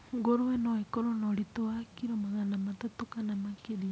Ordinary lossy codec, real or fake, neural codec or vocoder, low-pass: none; real; none; none